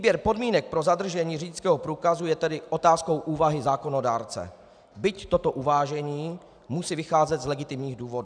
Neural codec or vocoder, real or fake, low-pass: none; real; 9.9 kHz